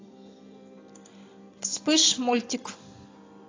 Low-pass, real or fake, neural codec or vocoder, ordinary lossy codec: 7.2 kHz; real; none; AAC, 32 kbps